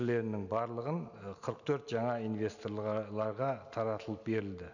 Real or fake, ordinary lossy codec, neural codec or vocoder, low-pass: real; none; none; 7.2 kHz